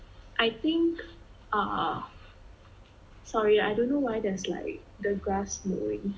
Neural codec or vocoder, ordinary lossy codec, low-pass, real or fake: none; none; none; real